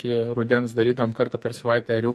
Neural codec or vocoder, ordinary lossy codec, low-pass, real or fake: codec, 44.1 kHz, 2.6 kbps, SNAC; MP3, 64 kbps; 14.4 kHz; fake